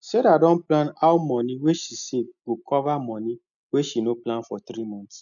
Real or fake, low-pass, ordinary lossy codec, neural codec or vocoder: real; 7.2 kHz; none; none